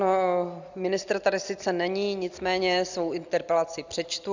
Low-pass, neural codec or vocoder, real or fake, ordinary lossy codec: 7.2 kHz; none; real; Opus, 64 kbps